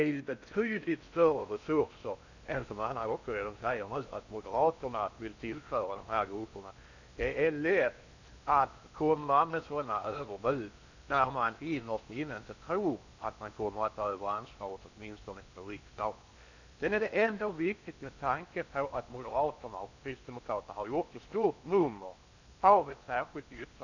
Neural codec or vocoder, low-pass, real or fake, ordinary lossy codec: codec, 16 kHz in and 24 kHz out, 0.8 kbps, FocalCodec, streaming, 65536 codes; 7.2 kHz; fake; none